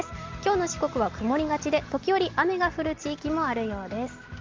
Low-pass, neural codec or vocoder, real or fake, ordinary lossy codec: 7.2 kHz; none; real; Opus, 32 kbps